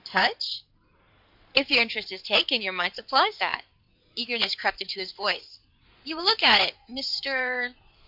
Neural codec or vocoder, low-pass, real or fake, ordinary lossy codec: codec, 16 kHz in and 24 kHz out, 2.2 kbps, FireRedTTS-2 codec; 5.4 kHz; fake; MP3, 48 kbps